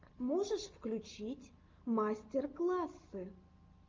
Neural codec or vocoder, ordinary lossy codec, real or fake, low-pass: none; Opus, 32 kbps; real; 7.2 kHz